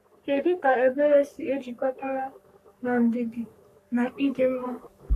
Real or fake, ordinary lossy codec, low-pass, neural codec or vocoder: fake; none; 14.4 kHz; codec, 44.1 kHz, 3.4 kbps, Pupu-Codec